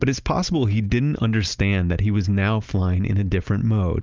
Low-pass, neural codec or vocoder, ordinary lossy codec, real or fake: 7.2 kHz; none; Opus, 24 kbps; real